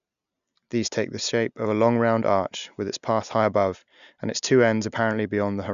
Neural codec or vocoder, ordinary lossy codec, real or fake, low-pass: none; none; real; 7.2 kHz